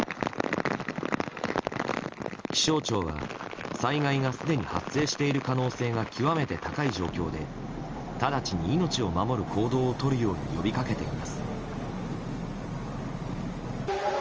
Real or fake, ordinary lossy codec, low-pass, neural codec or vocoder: real; Opus, 24 kbps; 7.2 kHz; none